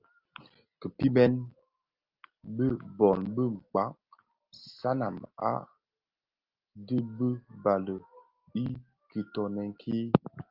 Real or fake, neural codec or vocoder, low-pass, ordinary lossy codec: real; none; 5.4 kHz; Opus, 32 kbps